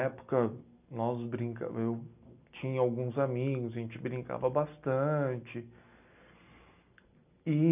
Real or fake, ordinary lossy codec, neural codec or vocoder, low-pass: real; none; none; 3.6 kHz